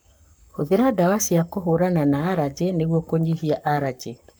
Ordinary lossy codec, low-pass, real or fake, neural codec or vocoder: none; none; fake; codec, 44.1 kHz, 7.8 kbps, Pupu-Codec